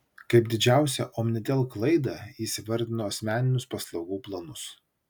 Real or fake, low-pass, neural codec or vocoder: real; 19.8 kHz; none